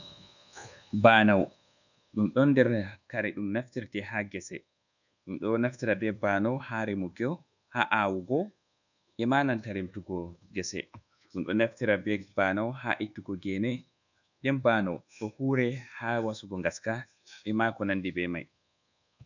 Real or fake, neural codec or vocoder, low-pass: fake; codec, 24 kHz, 1.2 kbps, DualCodec; 7.2 kHz